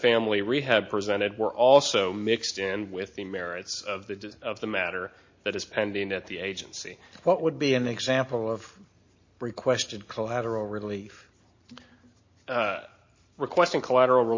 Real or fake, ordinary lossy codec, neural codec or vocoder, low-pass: real; MP3, 32 kbps; none; 7.2 kHz